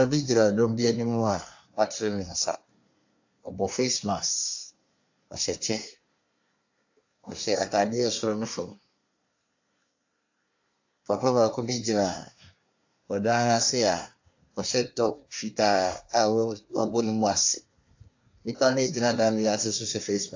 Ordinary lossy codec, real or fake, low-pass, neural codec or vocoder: AAC, 48 kbps; fake; 7.2 kHz; codec, 24 kHz, 1 kbps, SNAC